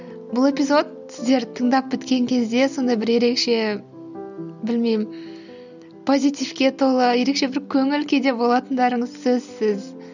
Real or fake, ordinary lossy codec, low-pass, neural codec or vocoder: real; none; 7.2 kHz; none